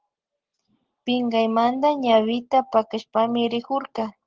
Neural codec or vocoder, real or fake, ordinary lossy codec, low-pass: none; real; Opus, 16 kbps; 7.2 kHz